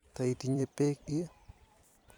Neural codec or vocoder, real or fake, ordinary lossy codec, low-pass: vocoder, 44.1 kHz, 128 mel bands every 256 samples, BigVGAN v2; fake; none; 19.8 kHz